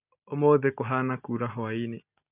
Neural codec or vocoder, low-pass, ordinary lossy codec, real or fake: none; 3.6 kHz; none; real